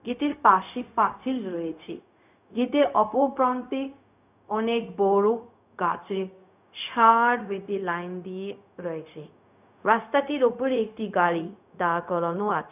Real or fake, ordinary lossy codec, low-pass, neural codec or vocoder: fake; none; 3.6 kHz; codec, 16 kHz, 0.4 kbps, LongCat-Audio-Codec